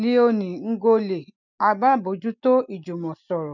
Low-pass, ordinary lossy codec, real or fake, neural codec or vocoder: 7.2 kHz; none; real; none